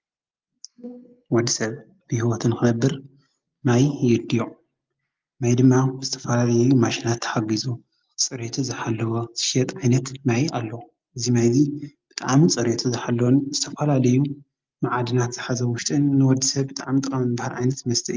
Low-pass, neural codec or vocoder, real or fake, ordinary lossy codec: 7.2 kHz; none; real; Opus, 32 kbps